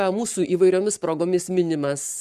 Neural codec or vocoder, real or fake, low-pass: codec, 44.1 kHz, 7.8 kbps, Pupu-Codec; fake; 14.4 kHz